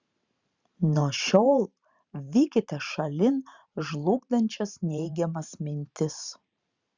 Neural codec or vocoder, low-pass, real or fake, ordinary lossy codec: vocoder, 44.1 kHz, 128 mel bands every 512 samples, BigVGAN v2; 7.2 kHz; fake; Opus, 64 kbps